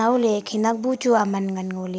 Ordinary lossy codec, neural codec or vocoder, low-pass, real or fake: none; none; none; real